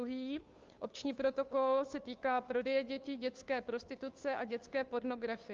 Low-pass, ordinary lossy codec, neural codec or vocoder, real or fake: 7.2 kHz; Opus, 32 kbps; codec, 16 kHz, 2 kbps, FunCodec, trained on Chinese and English, 25 frames a second; fake